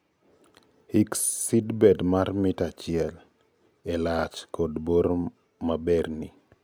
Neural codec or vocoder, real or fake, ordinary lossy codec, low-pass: none; real; none; none